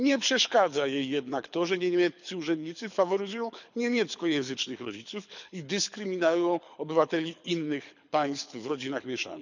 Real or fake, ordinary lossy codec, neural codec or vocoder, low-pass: fake; none; codec, 24 kHz, 6 kbps, HILCodec; 7.2 kHz